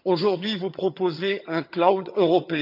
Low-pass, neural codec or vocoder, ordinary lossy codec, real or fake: 5.4 kHz; vocoder, 22.05 kHz, 80 mel bands, HiFi-GAN; none; fake